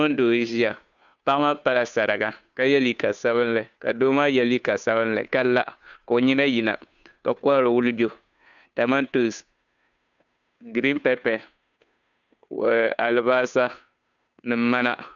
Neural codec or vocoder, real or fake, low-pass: codec, 16 kHz, 2 kbps, FunCodec, trained on Chinese and English, 25 frames a second; fake; 7.2 kHz